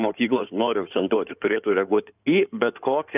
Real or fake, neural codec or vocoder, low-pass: fake; codec, 16 kHz in and 24 kHz out, 2.2 kbps, FireRedTTS-2 codec; 3.6 kHz